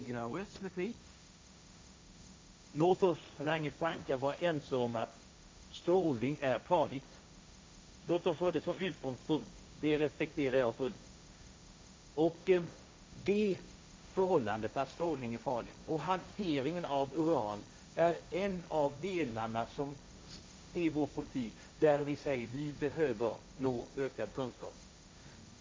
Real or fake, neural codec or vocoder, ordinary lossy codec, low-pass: fake; codec, 16 kHz, 1.1 kbps, Voila-Tokenizer; none; none